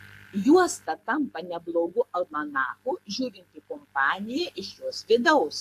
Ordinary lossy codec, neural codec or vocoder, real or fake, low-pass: AAC, 64 kbps; codec, 44.1 kHz, 7.8 kbps, Pupu-Codec; fake; 14.4 kHz